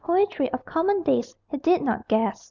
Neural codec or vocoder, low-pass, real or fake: none; 7.2 kHz; real